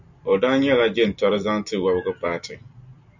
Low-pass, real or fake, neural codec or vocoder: 7.2 kHz; real; none